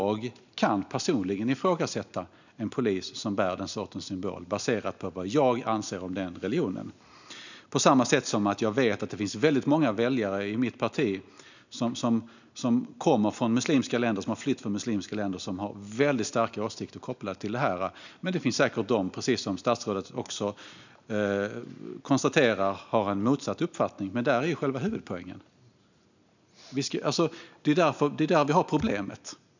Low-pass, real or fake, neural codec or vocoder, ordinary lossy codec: 7.2 kHz; real; none; none